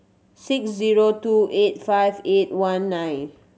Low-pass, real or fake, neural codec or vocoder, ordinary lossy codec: none; real; none; none